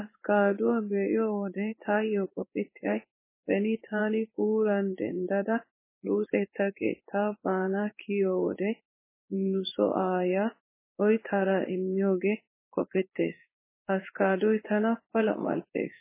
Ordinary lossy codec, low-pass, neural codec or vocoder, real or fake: MP3, 16 kbps; 3.6 kHz; codec, 16 kHz in and 24 kHz out, 1 kbps, XY-Tokenizer; fake